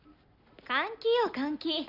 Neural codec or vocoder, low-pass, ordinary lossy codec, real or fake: none; 5.4 kHz; none; real